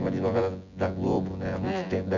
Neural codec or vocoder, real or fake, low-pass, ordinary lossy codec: vocoder, 24 kHz, 100 mel bands, Vocos; fake; 7.2 kHz; none